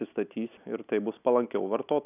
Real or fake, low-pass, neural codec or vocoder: real; 3.6 kHz; none